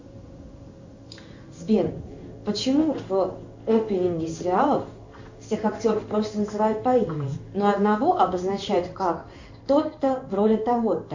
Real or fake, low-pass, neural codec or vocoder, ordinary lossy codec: fake; 7.2 kHz; codec, 16 kHz in and 24 kHz out, 1 kbps, XY-Tokenizer; Opus, 64 kbps